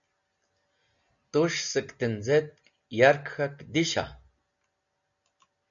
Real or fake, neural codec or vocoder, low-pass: real; none; 7.2 kHz